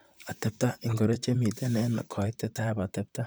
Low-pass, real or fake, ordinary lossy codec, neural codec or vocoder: none; fake; none; vocoder, 44.1 kHz, 128 mel bands, Pupu-Vocoder